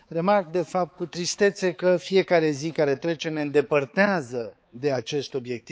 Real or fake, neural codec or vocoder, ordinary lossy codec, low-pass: fake; codec, 16 kHz, 4 kbps, X-Codec, HuBERT features, trained on balanced general audio; none; none